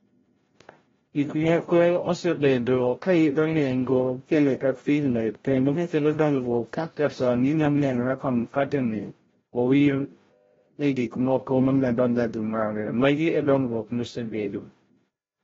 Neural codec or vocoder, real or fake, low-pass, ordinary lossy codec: codec, 16 kHz, 0.5 kbps, FreqCodec, larger model; fake; 7.2 kHz; AAC, 24 kbps